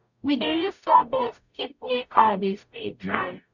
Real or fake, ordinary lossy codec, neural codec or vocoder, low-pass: fake; none; codec, 44.1 kHz, 0.9 kbps, DAC; 7.2 kHz